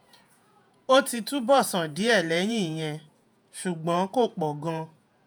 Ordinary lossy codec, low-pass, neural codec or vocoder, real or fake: none; none; vocoder, 48 kHz, 128 mel bands, Vocos; fake